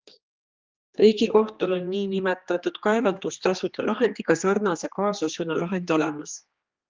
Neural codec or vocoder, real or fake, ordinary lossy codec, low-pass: codec, 16 kHz, 2 kbps, X-Codec, HuBERT features, trained on general audio; fake; Opus, 32 kbps; 7.2 kHz